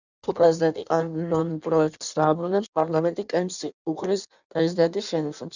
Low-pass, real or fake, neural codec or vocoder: 7.2 kHz; fake; codec, 16 kHz in and 24 kHz out, 1.1 kbps, FireRedTTS-2 codec